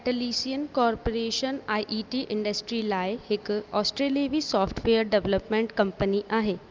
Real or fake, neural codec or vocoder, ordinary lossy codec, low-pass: real; none; Opus, 24 kbps; 7.2 kHz